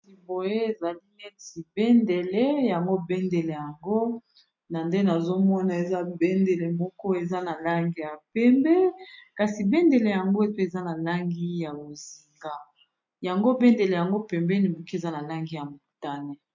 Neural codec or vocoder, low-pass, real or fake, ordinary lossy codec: none; 7.2 kHz; real; MP3, 48 kbps